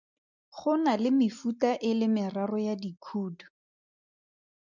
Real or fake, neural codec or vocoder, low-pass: real; none; 7.2 kHz